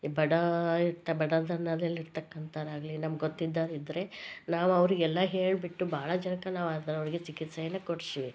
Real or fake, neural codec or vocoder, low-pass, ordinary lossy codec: real; none; none; none